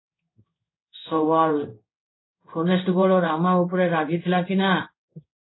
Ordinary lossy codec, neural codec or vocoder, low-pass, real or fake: AAC, 16 kbps; codec, 16 kHz in and 24 kHz out, 1 kbps, XY-Tokenizer; 7.2 kHz; fake